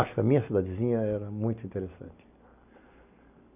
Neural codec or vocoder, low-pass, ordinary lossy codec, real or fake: none; 3.6 kHz; none; real